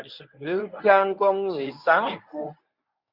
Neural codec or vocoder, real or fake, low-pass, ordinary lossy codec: codec, 24 kHz, 0.9 kbps, WavTokenizer, medium speech release version 1; fake; 5.4 kHz; Opus, 64 kbps